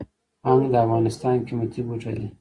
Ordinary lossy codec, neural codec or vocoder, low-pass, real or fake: Opus, 64 kbps; none; 10.8 kHz; real